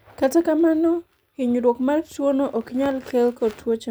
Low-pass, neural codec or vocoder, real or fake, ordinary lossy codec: none; none; real; none